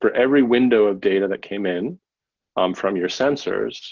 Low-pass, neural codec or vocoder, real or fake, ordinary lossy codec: 7.2 kHz; none; real; Opus, 16 kbps